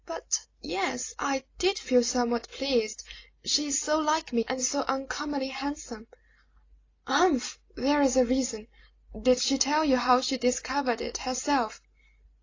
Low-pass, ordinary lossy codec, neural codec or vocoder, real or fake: 7.2 kHz; AAC, 32 kbps; none; real